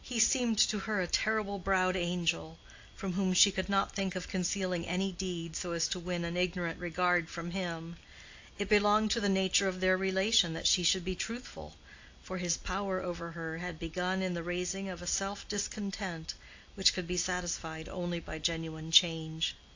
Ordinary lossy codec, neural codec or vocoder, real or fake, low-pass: AAC, 48 kbps; none; real; 7.2 kHz